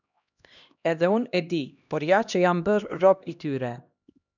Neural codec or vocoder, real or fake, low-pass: codec, 16 kHz, 1 kbps, X-Codec, HuBERT features, trained on LibriSpeech; fake; 7.2 kHz